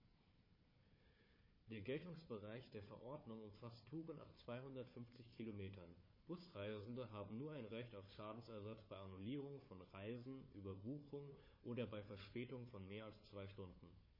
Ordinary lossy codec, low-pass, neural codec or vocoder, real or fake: MP3, 24 kbps; 5.4 kHz; codec, 16 kHz, 4 kbps, FunCodec, trained on Chinese and English, 50 frames a second; fake